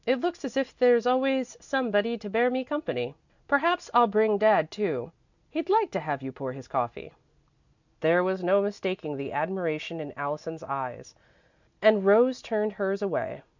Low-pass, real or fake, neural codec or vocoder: 7.2 kHz; real; none